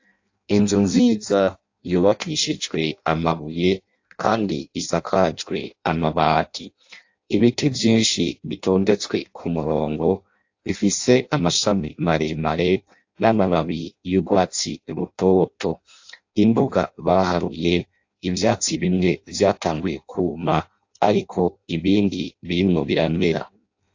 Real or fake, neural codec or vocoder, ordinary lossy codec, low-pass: fake; codec, 16 kHz in and 24 kHz out, 0.6 kbps, FireRedTTS-2 codec; AAC, 48 kbps; 7.2 kHz